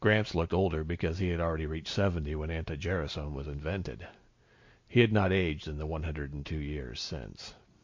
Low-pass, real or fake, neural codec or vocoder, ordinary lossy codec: 7.2 kHz; real; none; MP3, 48 kbps